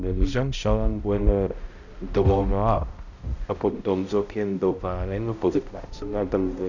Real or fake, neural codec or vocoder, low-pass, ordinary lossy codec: fake; codec, 16 kHz, 0.5 kbps, X-Codec, HuBERT features, trained on balanced general audio; 7.2 kHz; none